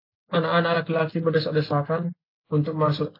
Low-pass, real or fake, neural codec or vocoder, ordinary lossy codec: 5.4 kHz; real; none; AAC, 32 kbps